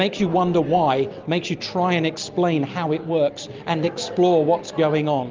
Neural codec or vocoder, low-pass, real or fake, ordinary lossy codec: none; 7.2 kHz; real; Opus, 24 kbps